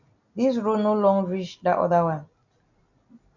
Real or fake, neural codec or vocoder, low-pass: real; none; 7.2 kHz